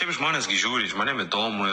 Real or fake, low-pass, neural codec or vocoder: real; 10.8 kHz; none